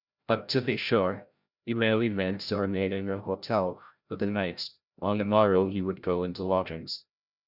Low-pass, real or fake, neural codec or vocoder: 5.4 kHz; fake; codec, 16 kHz, 0.5 kbps, FreqCodec, larger model